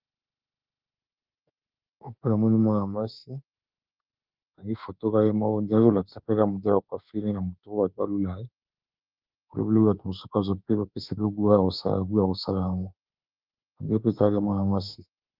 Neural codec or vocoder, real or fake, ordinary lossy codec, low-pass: autoencoder, 48 kHz, 32 numbers a frame, DAC-VAE, trained on Japanese speech; fake; Opus, 32 kbps; 5.4 kHz